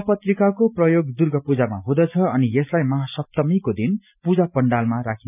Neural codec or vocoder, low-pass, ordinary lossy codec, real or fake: none; 3.6 kHz; none; real